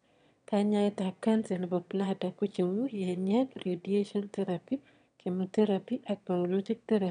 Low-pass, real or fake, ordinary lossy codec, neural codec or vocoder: 9.9 kHz; fake; none; autoencoder, 22.05 kHz, a latent of 192 numbers a frame, VITS, trained on one speaker